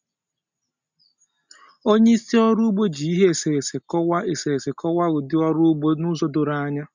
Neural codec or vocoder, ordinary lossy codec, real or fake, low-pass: none; none; real; 7.2 kHz